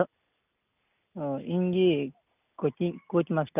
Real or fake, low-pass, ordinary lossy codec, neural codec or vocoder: real; 3.6 kHz; none; none